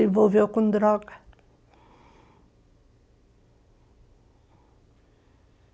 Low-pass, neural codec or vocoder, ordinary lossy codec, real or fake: none; none; none; real